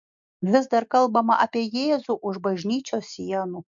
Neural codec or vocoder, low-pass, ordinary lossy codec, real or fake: none; 7.2 kHz; MP3, 64 kbps; real